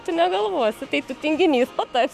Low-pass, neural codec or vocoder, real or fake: 14.4 kHz; autoencoder, 48 kHz, 128 numbers a frame, DAC-VAE, trained on Japanese speech; fake